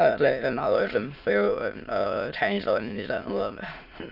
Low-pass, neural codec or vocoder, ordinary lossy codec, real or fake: 5.4 kHz; autoencoder, 22.05 kHz, a latent of 192 numbers a frame, VITS, trained on many speakers; none; fake